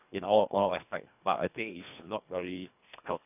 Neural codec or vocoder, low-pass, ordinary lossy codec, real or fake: codec, 24 kHz, 1.5 kbps, HILCodec; 3.6 kHz; none; fake